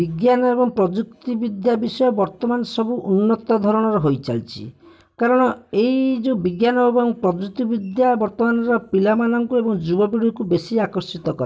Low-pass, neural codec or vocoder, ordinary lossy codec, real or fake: none; none; none; real